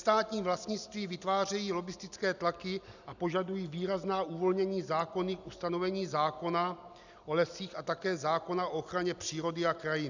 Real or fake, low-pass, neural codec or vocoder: real; 7.2 kHz; none